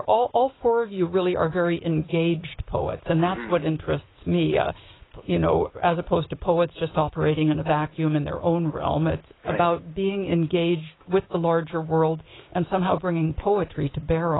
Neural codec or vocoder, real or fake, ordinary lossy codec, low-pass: codec, 16 kHz, 6 kbps, DAC; fake; AAC, 16 kbps; 7.2 kHz